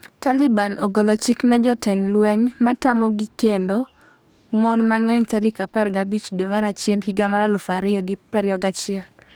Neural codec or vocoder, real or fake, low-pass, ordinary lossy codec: codec, 44.1 kHz, 2.6 kbps, DAC; fake; none; none